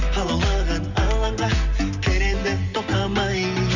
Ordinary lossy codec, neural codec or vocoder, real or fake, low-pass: none; none; real; 7.2 kHz